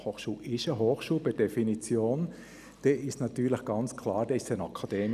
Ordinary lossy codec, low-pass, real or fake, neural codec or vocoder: none; 14.4 kHz; real; none